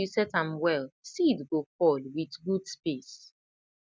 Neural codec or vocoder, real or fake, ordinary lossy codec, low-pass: none; real; none; none